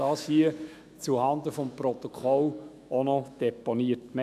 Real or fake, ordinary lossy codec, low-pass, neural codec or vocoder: fake; none; 14.4 kHz; autoencoder, 48 kHz, 128 numbers a frame, DAC-VAE, trained on Japanese speech